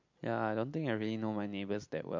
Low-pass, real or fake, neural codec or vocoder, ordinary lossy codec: 7.2 kHz; real; none; MP3, 48 kbps